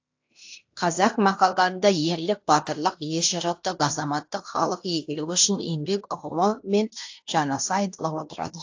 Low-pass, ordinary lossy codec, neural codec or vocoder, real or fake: 7.2 kHz; AAC, 48 kbps; codec, 16 kHz in and 24 kHz out, 0.9 kbps, LongCat-Audio-Codec, fine tuned four codebook decoder; fake